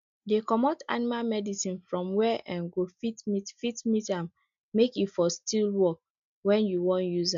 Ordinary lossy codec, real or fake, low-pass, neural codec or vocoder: none; real; 7.2 kHz; none